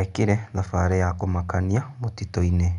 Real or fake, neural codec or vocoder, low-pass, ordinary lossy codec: real; none; 10.8 kHz; none